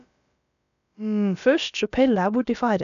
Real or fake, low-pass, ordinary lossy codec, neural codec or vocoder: fake; 7.2 kHz; none; codec, 16 kHz, about 1 kbps, DyCAST, with the encoder's durations